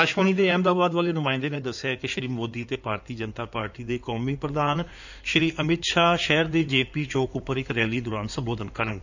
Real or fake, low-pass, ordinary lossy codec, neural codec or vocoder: fake; 7.2 kHz; none; codec, 16 kHz in and 24 kHz out, 2.2 kbps, FireRedTTS-2 codec